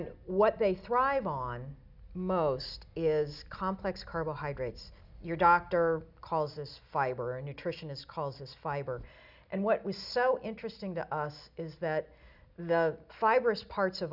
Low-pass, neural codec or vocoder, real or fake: 5.4 kHz; none; real